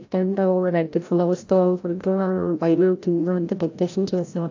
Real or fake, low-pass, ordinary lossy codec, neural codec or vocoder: fake; 7.2 kHz; AAC, 48 kbps; codec, 16 kHz, 0.5 kbps, FreqCodec, larger model